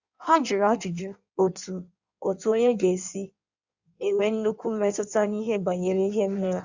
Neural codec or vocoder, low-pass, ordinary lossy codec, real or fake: codec, 16 kHz in and 24 kHz out, 1.1 kbps, FireRedTTS-2 codec; 7.2 kHz; Opus, 64 kbps; fake